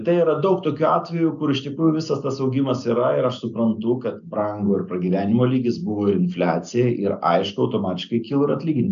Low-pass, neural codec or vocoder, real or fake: 7.2 kHz; none; real